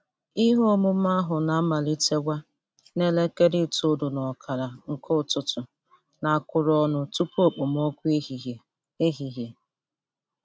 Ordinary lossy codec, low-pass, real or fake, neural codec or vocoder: none; none; real; none